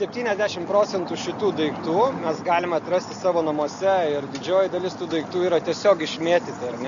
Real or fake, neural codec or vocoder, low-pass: real; none; 7.2 kHz